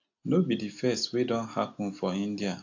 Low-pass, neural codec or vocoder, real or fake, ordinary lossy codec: 7.2 kHz; none; real; AAC, 48 kbps